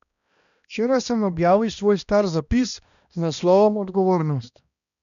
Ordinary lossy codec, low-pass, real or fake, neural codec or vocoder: none; 7.2 kHz; fake; codec, 16 kHz, 1 kbps, X-Codec, HuBERT features, trained on balanced general audio